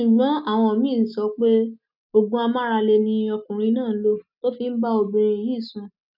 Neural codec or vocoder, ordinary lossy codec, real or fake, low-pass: none; none; real; 5.4 kHz